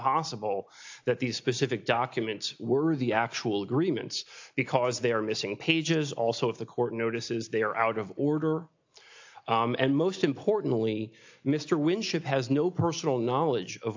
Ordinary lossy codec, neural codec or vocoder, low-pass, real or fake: AAC, 48 kbps; none; 7.2 kHz; real